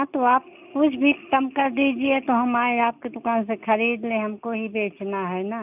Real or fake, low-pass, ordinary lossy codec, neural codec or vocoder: real; 3.6 kHz; none; none